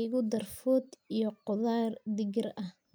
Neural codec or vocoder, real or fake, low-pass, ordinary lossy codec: none; real; none; none